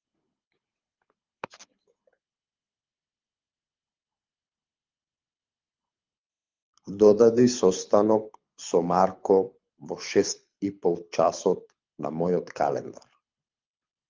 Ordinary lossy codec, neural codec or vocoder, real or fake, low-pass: Opus, 32 kbps; codec, 24 kHz, 6 kbps, HILCodec; fake; 7.2 kHz